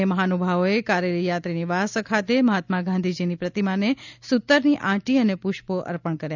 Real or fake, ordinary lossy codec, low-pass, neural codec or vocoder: real; none; 7.2 kHz; none